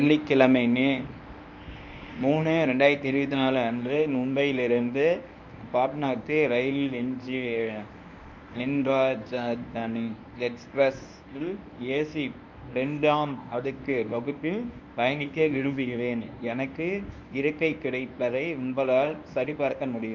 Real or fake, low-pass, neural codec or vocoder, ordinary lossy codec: fake; 7.2 kHz; codec, 24 kHz, 0.9 kbps, WavTokenizer, medium speech release version 1; none